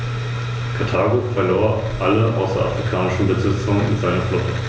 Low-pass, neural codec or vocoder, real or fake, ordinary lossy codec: none; none; real; none